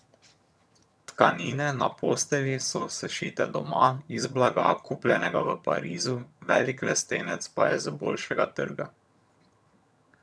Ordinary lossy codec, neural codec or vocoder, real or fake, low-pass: none; vocoder, 22.05 kHz, 80 mel bands, HiFi-GAN; fake; none